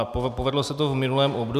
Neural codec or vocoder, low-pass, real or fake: none; 14.4 kHz; real